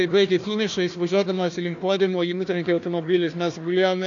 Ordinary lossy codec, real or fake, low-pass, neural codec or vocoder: AAC, 64 kbps; fake; 7.2 kHz; codec, 16 kHz, 1 kbps, FunCodec, trained on Chinese and English, 50 frames a second